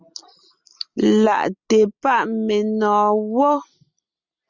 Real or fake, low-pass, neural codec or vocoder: real; 7.2 kHz; none